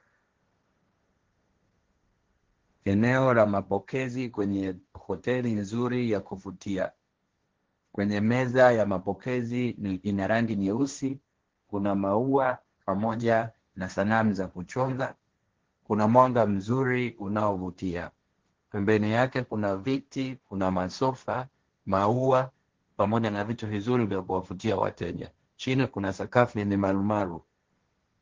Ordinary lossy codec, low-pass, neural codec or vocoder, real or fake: Opus, 16 kbps; 7.2 kHz; codec, 16 kHz, 1.1 kbps, Voila-Tokenizer; fake